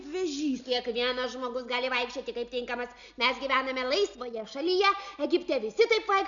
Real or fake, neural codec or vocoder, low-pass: real; none; 7.2 kHz